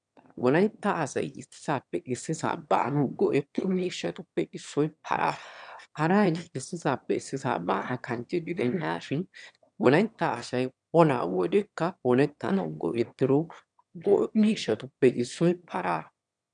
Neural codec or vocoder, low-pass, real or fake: autoencoder, 22.05 kHz, a latent of 192 numbers a frame, VITS, trained on one speaker; 9.9 kHz; fake